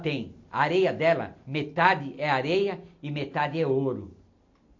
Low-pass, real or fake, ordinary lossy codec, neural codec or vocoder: 7.2 kHz; real; AAC, 48 kbps; none